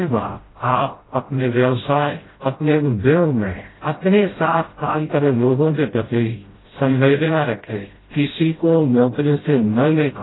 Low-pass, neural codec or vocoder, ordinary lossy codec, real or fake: 7.2 kHz; codec, 16 kHz, 0.5 kbps, FreqCodec, smaller model; AAC, 16 kbps; fake